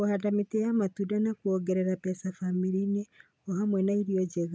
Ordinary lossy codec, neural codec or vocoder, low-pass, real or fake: none; none; none; real